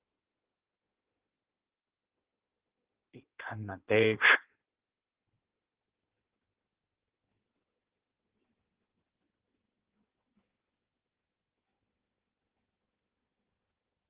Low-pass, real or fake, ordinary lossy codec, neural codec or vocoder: 3.6 kHz; fake; Opus, 16 kbps; codec, 16 kHz in and 24 kHz out, 1.1 kbps, FireRedTTS-2 codec